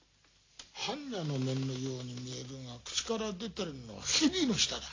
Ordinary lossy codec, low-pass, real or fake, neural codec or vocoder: AAC, 32 kbps; 7.2 kHz; real; none